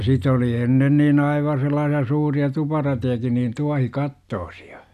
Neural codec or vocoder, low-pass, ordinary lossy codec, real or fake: none; 14.4 kHz; none; real